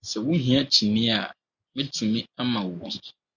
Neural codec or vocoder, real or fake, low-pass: none; real; 7.2 kHz